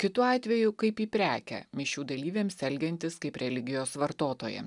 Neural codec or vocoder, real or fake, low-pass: none; real; 10.8 kHz